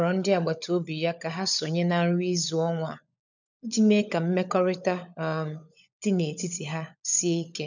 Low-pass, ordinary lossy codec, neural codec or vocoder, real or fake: 7.2 kHz; none; codec, 16 kHz, 16 kbps, FunCodec, trained on LibriTTS, 50 frames a second; fake